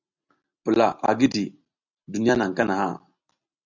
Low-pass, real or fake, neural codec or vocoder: 7.2 kHz; real; none